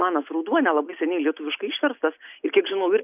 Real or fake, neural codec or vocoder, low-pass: real; none; 3.6 kHz